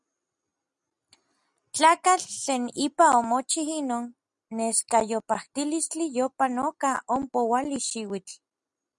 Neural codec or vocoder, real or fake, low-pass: none; real; 10.8 kHz